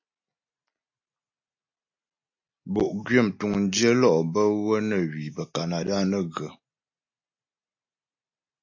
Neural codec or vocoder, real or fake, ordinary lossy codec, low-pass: none; real; AAC, 48 kbps; 7.2 kHz